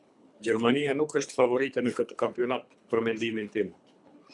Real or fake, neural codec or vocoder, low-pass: fake; codec, 24 kHz, 3 kbps, HILCodec; 10.8 kHz